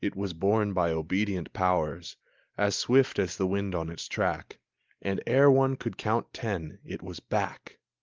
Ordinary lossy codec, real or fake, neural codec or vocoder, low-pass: Opus, 32 kbps; real; none; 7.2 kHz